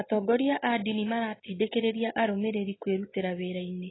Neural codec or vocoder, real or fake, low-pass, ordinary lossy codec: none; real; 7.2 kHz; AAC, 16 kbps